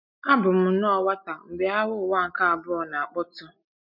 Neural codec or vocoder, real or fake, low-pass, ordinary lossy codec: none; real; 5.4 kHz; none